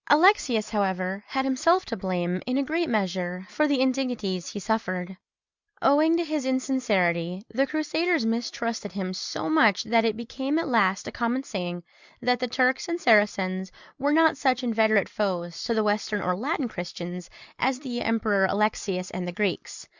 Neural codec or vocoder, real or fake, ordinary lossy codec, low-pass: none; real; Opus, 64 kbps; 7.2 kHz